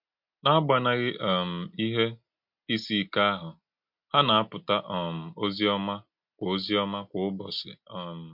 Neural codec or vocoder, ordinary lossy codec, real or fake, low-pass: none; MP3, 48 kbps; real; 5.4 kHz